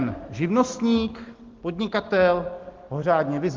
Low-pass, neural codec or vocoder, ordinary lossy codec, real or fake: 7.2 kHz; none; Opus, 16 kbps; real